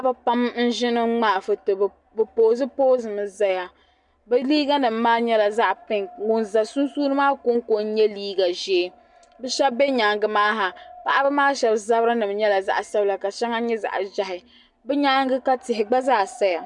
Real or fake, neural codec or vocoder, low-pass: real; none; 10.8 kHz